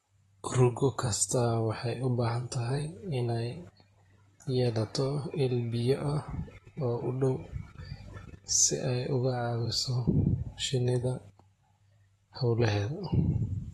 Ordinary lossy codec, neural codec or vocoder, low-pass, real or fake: AAC, 32 kbps; vocoder, 44.1 kHz, 128 mel bands, Pupu-Vocoder; 19.8 kHz; fake